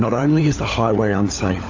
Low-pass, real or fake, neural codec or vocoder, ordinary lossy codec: 7.2 kHz; fake; codec, 16 kHz, 16 kbps, FunCodec, trained on LibriTTS, 50 frames a second; AAC, 48 kbps